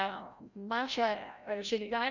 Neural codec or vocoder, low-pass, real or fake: codec, 16 kHz, 0.5 kbps, FreqCodec, larger model; 7.2 kHz; fake